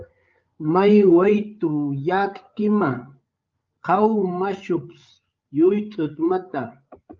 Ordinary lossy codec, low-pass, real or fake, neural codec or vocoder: Opus, 24 kbps; 7.2 kHz; fake; codec, 16 kHz, 8 kbps, FreqCodec, larger model